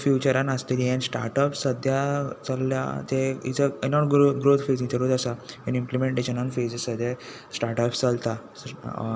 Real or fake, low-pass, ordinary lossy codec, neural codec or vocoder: real; none; none; none